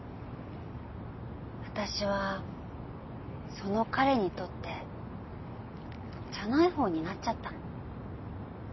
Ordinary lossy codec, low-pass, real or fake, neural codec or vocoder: MP3, 24 kbps; 7.2 kHz; real; none